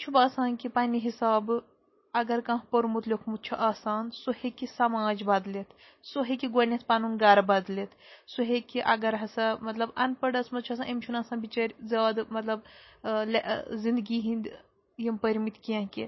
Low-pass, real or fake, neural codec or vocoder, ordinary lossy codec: 7.2 kHz; real; none; MP3, 24 kbps